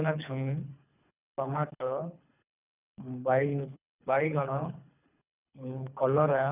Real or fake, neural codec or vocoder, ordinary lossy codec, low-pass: fake; codec, 24 kHz, 3 kbps, HILCodec; none; 3.6 kHz